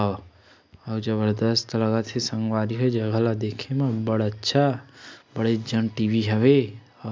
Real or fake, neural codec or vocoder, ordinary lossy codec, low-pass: real; none; none; none